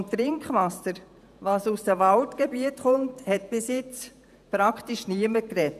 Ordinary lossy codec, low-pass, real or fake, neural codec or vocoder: none; 14.4 kHz; fake; vocoder, 48 kHz, 128 mel bands, Vocos